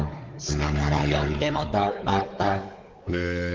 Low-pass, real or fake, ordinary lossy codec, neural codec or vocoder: 7.2 kHz; fake; Opus, 24 kbps; codec, 16 kHz, 4 kbps, X-Codec, WavLM features, trained on Multilingual LibriSpeech